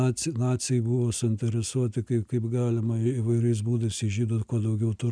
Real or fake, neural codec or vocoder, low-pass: real; none; 9.9 kHz